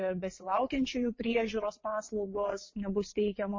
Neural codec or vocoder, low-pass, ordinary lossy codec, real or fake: vocoder, 22.05 kHz, 80 mel bands, WaveNeXt; 7.2 kHz; MP3, 32 kbps; fake